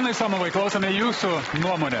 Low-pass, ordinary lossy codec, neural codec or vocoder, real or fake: 19.8 kHz; AAC, 24 kbps; none; real